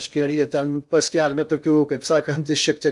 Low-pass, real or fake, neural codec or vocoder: 10.8 kHz; fake; codec, 16 kHz in and 24 kHz out, 0.6 kbps, FocalCodec, streaming, 2048 codes